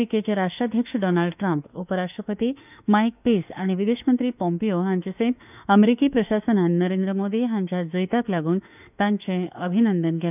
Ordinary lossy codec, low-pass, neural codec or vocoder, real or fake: none; 3.6 kHz; autoencoder, 48 kHz, 32 numbers a frame, DAC-VAE, trained on Japanese speech; fake